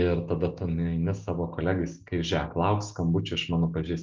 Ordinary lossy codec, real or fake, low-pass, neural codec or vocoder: Opus, 24 kbps; real; 7.2 kHz; none